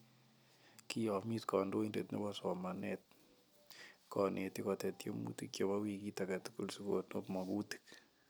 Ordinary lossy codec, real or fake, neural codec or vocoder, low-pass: none; real; none; none